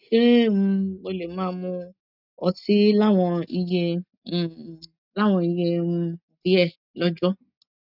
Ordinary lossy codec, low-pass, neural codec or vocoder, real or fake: none; 5.4 kHz; none; real